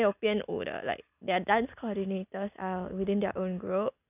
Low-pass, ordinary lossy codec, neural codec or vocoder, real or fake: 3.6 kHz; none; none; real